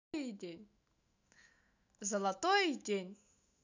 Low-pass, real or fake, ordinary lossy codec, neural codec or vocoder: 7.2 kHz; fake; none; autoencoder, 48 kHz, 128 numbers a frame, DAC-VAE, trained on Japanese speech